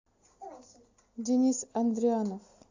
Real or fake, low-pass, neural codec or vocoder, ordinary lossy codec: real; 7.2 kHz; none; AAC, 48 kbps